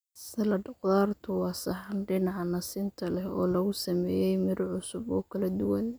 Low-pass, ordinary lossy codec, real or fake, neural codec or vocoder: none; none; real; none